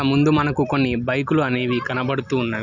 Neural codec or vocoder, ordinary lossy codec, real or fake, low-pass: none; none; real; 7.2 kHz